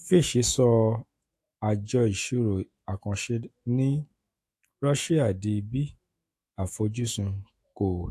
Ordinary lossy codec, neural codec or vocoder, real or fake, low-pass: AAC, 64 kbps; autoencoder, 48 kHz, 128 numbers a frame, DAC-VAE, trained on Japanese speech; fake; 14.4 kHz